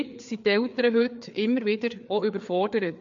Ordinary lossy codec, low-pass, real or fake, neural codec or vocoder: MP3, 48 kbps; 7.2 kHz; fake; codec, 16 kHz, 4 kbps, FreqCodec, larger model